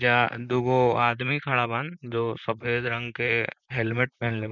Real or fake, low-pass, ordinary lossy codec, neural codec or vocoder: fake; 7.2 kHz; none; vocoder, 44.1 kHz, 128 mel bands, Pupu-Vocoder